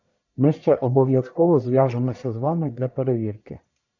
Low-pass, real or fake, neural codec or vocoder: 7.2 kHz; fake; codec, 44.1 kHz, 1.7 kbps, Pupu-Codec